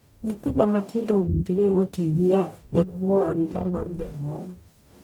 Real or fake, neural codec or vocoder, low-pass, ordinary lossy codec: fake; codec, 44.1 kHz, 0.9 kbps, DAC; 19.8 kHz; MP3, 96 kbps